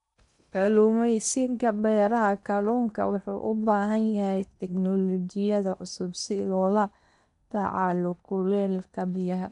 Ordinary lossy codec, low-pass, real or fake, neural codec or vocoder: none; 10.8 kHz; fake; codec, 16 kHz in and 24 kHz out, 0.8 kbps, FocalCodec, streaming, 65536 codes